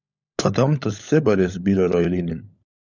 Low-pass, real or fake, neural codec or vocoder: 7.2 kHz; fake; codec, 16 kHz, 16 kbps, FunCodec, trained on LibriTTS, 50 frames a second